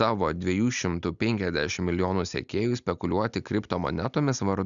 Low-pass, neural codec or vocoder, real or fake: 7.2 kHz; none; real